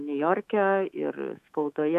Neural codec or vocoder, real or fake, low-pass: autoencoder, 48 kHz, 128 numbers a frame, DAC-VAE, trained on Japanese speech; fake; 14.4 kHz